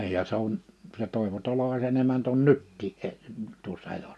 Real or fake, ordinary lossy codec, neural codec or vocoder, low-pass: real; none; none; none